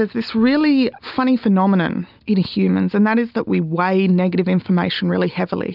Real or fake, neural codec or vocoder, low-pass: real; none; 5.4 kHz